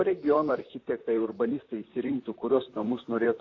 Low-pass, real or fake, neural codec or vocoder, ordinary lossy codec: 7.2 kHz; fake; vocoder, 44.1 kHz, 128 mel bands, Pupu-Vocoder; AAC, 32 kbps